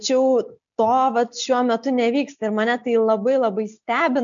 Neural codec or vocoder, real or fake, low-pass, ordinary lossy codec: none; real; 7.2 kHz; MP3, 96 kbps